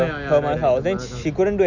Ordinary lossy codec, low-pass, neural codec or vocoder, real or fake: none; 7.2 kHz; none; real